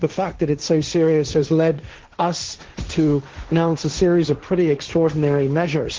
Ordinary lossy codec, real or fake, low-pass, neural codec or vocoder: Opus, 16 kbps; fake; 7.2 kHz; codec, 16 kHz, 1.1 kbps, Voila-Tokenizer